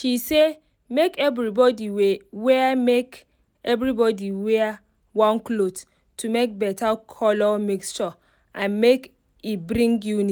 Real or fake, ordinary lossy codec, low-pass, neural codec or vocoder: real; none; none; none